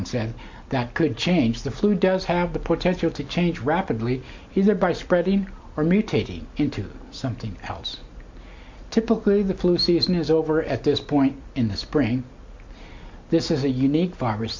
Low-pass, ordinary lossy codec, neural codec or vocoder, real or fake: 7.2 kHz; MP3, 48 kbps; none; real